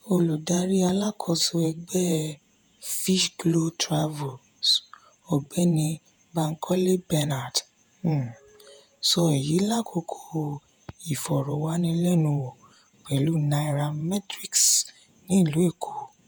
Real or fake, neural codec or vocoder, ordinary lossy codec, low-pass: fake; vocoder, 48 kHz, 128 mel bands, Vocos; none; none